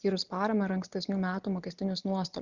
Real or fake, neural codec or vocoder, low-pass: real; none; 7.2 kHz